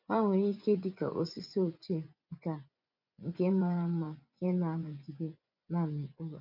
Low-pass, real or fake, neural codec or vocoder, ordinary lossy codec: 5.4 kHz; fake; vocoder, 24 kHz, 100 mel bands, Vocos; none